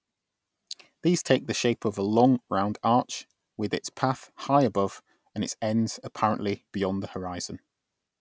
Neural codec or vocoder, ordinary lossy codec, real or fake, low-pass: none; none; real; none